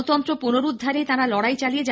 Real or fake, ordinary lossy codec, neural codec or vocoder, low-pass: real; none; none; none